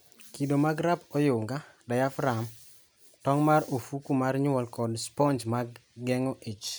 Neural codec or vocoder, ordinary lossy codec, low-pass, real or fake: none; none; none; real